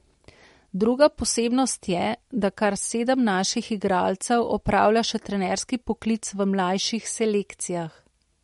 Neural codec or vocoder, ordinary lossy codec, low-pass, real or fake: none; MP3, 48 kbps; 14.4 kHz; real